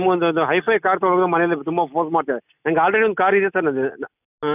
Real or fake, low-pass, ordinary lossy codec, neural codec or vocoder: real; 3.6 kHz; none; none